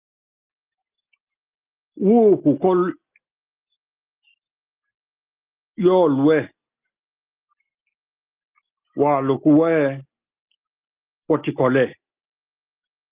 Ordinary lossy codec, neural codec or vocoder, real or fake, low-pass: Opus, 24 kbps; none; real; 3.6 kHz